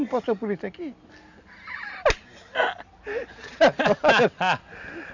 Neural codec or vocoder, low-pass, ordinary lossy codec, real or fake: none; 7.2 kHz; none; real